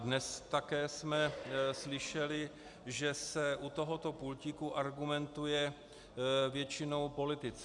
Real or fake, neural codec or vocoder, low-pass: real; none; 10.8 kHz